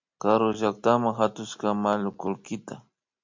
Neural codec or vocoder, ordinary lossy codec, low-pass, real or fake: none; MP3, 48 kbps; 7.2 kHz; real